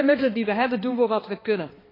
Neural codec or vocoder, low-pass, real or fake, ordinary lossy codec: codec, 16 kHz, 2 kbps, X-Codec, HuBERT features, trained on balanced general audio; 5.4 kHz; fake; AAC, 24 kbps